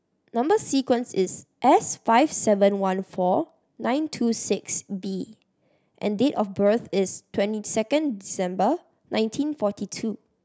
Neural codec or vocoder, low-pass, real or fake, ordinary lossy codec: none; none; real; none